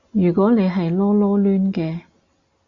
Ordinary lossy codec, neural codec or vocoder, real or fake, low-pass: Opus, 64 kbps; none; real; 7.2 kHz